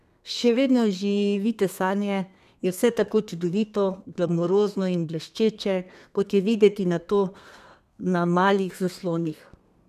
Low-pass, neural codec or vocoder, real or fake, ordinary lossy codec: 14.4 kHz; codec, 32 kHz, 1.9 kbps, SNAC; fake; none